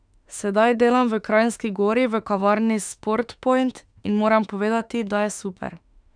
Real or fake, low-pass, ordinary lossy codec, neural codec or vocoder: fake; 9.9 kHz; none; autoencoder, 48 kHz, 32 numbers a frame, DAC-VAE, trained on Japanese speech